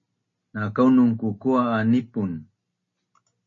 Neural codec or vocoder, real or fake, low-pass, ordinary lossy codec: none; real; 7.2 kHz; MP3, 32 kbps